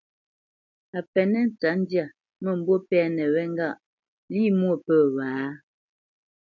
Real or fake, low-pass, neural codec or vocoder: real; 7.2 kHz; none